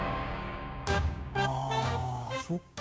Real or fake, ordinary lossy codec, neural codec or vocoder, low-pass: fake; none; codec, 16 kHz, 6 kbps, DAC; none